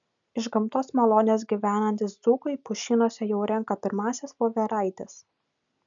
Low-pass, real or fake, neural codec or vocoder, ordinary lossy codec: 7.2 kHz; real; none; MP3, 96 kbps